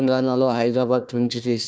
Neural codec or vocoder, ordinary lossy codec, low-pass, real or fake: codec, 16 kHz, 1 kbps, FunCodec, trained on Chinese and English, 50 frames a second; none; none; fake